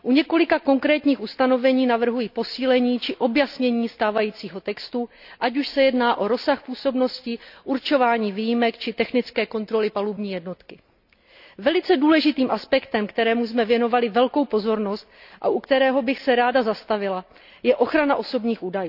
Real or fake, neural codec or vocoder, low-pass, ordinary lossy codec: real; none; 5.4 kHz; none